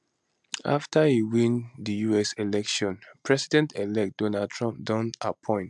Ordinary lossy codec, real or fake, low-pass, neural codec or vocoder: MP3, 96 kbps; real; 10.8 kHz; none